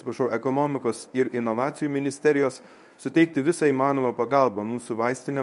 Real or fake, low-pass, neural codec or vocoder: fake; 10.8 kHz; codec, 24 kHz, 0.9 kbps, WavTokenizer, medium speech release version 1